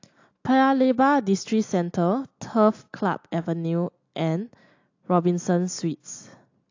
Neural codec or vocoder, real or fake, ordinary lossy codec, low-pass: none; real; AAC, 48 kbps; 7.2 kHz